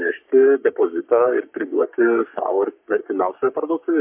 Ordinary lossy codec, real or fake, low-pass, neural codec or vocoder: MP3, 32 kbps; fake; 3.6 kHz; codec, 44.1 kHz, 3.4 kbps, Pupu-Codec